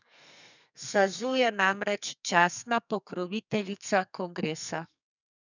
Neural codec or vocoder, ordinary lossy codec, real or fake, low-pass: codec, 32 kHz, 1.9 kbps, SNAC; none; fake; 7.2 kHz